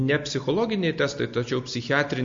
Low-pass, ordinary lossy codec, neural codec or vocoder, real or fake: 7.2 kHz; MP3, 48 kbps; none; real